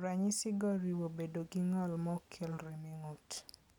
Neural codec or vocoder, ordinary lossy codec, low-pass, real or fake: none; none; 19.8 kHz; real